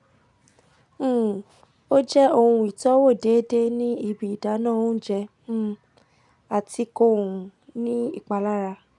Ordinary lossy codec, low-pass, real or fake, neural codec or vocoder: none; 10.8 kHz; real; none